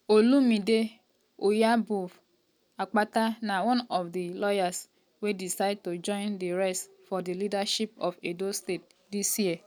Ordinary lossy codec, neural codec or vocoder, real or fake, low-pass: none; none; real; none